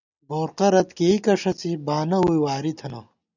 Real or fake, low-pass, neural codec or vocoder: real; 7.2 kHz; none